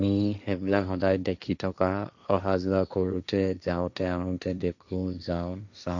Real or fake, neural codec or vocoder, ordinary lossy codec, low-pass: fake; codec, 16 kHz, 1.1 kbps, Voila-Tokenizer; none; 7.2 kHz